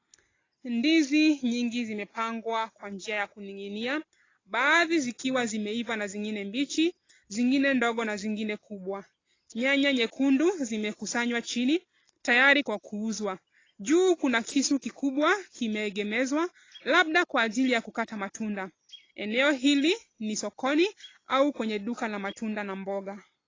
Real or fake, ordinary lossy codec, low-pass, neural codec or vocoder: real; AAC, 32 kbps; 7.2 kHz; none